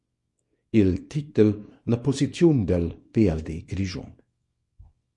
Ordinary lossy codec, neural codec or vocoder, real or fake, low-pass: MP3, 48 kbps; codec, 24 kHz, 0.9 kbps, WavTokenizer, small release; fake; 10.8 kHz